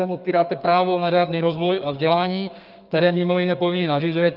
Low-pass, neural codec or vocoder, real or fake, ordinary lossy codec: 5.4 kHz; codec, 32 kHz, 1.9 kbps, SNAC; fake; Opus, 24 kbps